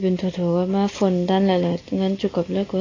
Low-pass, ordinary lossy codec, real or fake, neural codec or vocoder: 7.2 kHz; AAC, 32 kbps; real; none